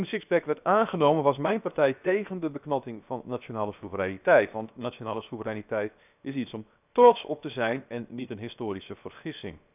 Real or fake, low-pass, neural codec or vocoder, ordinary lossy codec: fake; 3.6 kHz; codec, 16 kHz, 0.7 kbps, FocalCodec; none